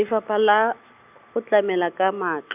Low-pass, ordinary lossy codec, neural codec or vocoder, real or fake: 3.6 kHz; none; none; real